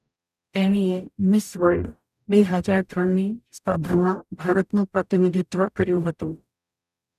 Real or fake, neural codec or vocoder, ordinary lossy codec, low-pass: fake; codec, 44.1 kHz, 0.9 kbps, DAC; none; 14.4 kHz